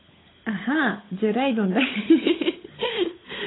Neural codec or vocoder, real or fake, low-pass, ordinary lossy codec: codec, 16 kHz, 16 kbps, FunCodec, trained on LibriTTS, 50 frames a second; fake; 7.2 kHz; AAC, 16 kbps